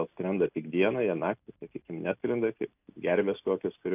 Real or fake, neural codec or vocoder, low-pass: real; none; 3.6 kHz